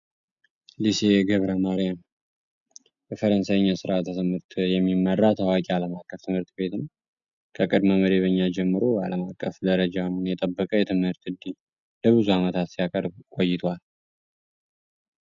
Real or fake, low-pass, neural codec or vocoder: real; 7.2 kHz; none